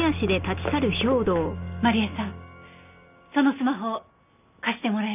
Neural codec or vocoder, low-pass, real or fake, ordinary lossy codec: none; 3.6 kHz; real; none